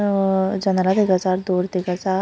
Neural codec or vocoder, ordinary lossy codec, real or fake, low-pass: none; none; real; none